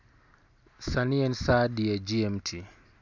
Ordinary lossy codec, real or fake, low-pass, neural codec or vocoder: none; real; 7.2 kHz; none